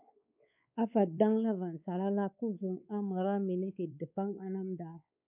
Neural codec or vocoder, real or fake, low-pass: codec, 16 kHz, 4 kbps, X-Codec, WavLM features, trained on Multilingual LibriSpeech; fake; 3.6 kHz